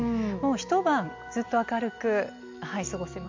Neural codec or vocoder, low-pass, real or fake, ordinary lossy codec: none; 7.2 kHz; real; MP3, 48 kbps